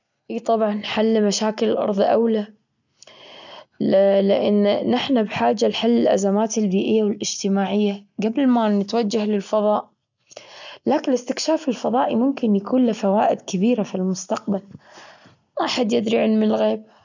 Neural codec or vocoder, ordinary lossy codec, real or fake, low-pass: none; none; real; 7.2 kHz